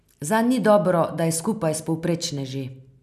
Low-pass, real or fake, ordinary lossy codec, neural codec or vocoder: 14.4 kHz; real; none; none